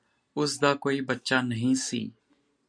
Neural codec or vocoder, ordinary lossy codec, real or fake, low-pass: none; MP3, 48 kbps; real; 9.9 kHz